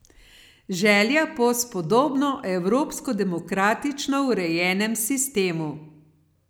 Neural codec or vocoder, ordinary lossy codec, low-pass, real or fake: none; none; none; real